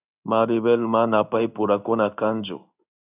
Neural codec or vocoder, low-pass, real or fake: codec, 16 kHz in and 24 kHz out, 1 kbps, XY-Tokenizer; 3.6 kHz; fake